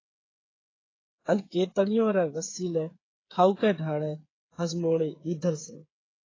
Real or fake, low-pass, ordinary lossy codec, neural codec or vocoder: fake; 7.2 kHz; AAC, 32 kbps; codec, 16 kHz, 8 kbps, FreqCodec, smaller model